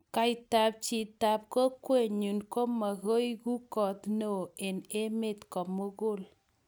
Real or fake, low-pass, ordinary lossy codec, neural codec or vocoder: real; none; none; none